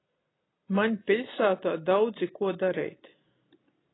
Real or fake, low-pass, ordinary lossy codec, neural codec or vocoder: real; 7.2 kHz; AAC, 16 kbps; none